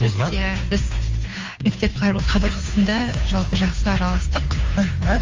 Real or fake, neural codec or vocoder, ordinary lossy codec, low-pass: fake; codec, 16 kHz, 2 kbps, FunCodec, trained on Chinese and English, 25 frames a second; Opus, 32 kbps; 7.2 kHz